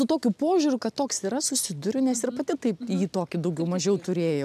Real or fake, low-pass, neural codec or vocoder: real; 14.4 kHz; none